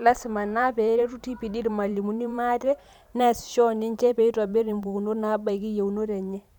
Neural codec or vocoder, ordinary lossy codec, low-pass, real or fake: vocoder, 44.1 kHz, 128 mel bands every 512 samples, BigVGAN v2; none; 19.8 kHz; fake